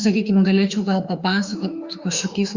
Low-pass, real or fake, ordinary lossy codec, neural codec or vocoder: 7.2 kHz; fake; Opus, 64 kbps; autoencoder, 48 kHz, 32 numbers a frame, DAC-VAE, trained on Japanese speech